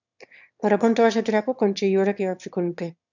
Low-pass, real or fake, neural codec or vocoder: 7.2 kHz; fake; autoencoder, 22.05 kHz, a latent of 192 numbers a frame, VITS, trained on one speaker